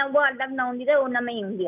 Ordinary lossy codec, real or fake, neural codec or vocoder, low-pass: none; real; none; 3.6 kHz